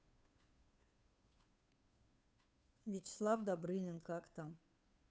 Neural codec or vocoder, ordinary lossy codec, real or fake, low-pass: codec, 16 kHz, 2 kbps, FunCodec, trained on Chinese and English, 25 frames a second; none; fake; none